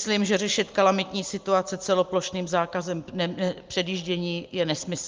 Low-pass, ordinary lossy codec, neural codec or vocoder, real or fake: 7.2 kHz; Opus, 24 kbps; none; real